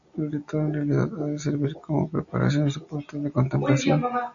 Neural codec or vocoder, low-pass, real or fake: none; 7.2 kHz; real